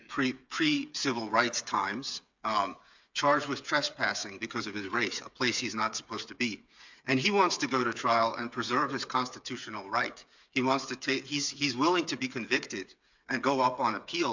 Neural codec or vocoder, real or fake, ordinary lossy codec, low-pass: codec, 16 kHz, 8 kbps, FreqCodec, smaller model; fake; MP3, 64 kbps; 7.2 kHz